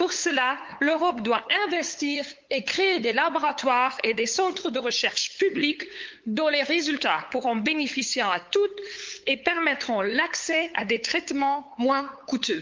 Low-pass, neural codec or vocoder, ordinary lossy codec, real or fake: 7.2 kHz; codec, 16 kHz, 8 kbps, FunCodec, trained on LibriTTS, 25 frames a second; Opus, 24 kbps; fake